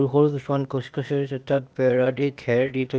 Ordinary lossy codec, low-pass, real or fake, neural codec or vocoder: none; none; fake; codec, 16 kHz, 0.8 kbps, ZipCodec